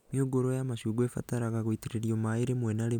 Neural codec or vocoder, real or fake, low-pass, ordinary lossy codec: none; real; 19.8 kHz; none